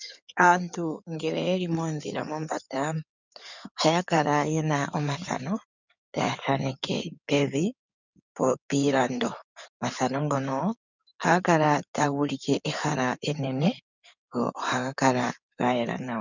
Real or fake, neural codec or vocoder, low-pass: fake; codec, 16 kHz in and 24 kHz out, 2.2 kbps, FireRedTTS-2 codec; 7.2 kHz